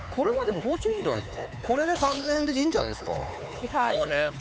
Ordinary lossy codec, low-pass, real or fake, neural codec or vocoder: none; none; fake; codec, 16 kHz, 4 kbps, X-Codec, HuBERT features, trained on LibriSpeech